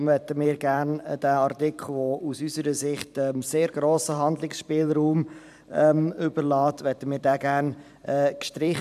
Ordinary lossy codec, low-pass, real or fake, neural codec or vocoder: none; 14.4 kHz; fake; vocoder, 44.1 kHz, 128 mel bands every 512 samples, BigVGAN v2